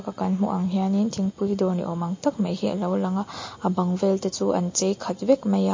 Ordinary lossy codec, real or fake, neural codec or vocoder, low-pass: MP3, 32 kbps; real; none; 7.2 kHz